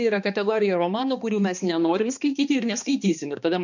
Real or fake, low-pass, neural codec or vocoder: fake; 7.2 kHz; codec, 16 kHz, 2 kbps, X-Codec, HuBERT features, trained on balanced general audio